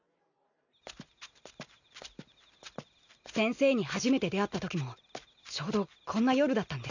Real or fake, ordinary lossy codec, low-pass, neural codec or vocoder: real; none; 7.2 kHz; none